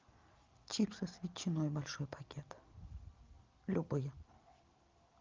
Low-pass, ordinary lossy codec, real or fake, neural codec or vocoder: 7.2 kHz; Opus, 32 kbps; real; none